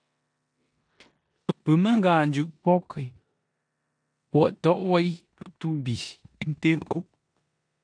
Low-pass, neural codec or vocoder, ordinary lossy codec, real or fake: 9.9 kHz; codec, 16 kHz in and 24 kHz out, 0.9 kbps, LongCat-Audio-Codec, four codebook decoder; AAC, 64 kbps; fake